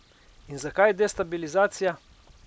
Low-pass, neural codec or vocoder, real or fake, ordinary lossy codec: none; none; real; none